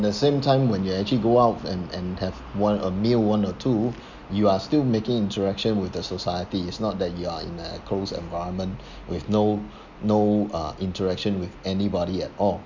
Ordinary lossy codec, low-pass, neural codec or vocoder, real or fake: none; 7.2 kHz; none; real